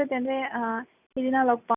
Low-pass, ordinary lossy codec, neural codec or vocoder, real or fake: 3.6 kHz; none; none; real